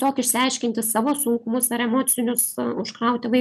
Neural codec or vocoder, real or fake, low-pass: none; real; 14.4 kHz